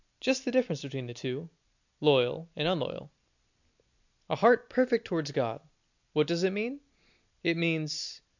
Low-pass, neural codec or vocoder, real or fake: 7.2 kHz; none; real